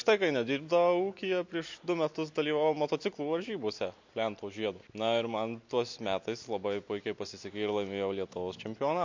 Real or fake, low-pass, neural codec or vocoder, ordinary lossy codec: real; 7.2 kHz; none; MP3, 48 kbps